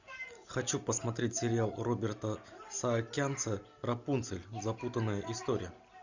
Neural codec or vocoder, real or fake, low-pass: none; real; 7.2 kHz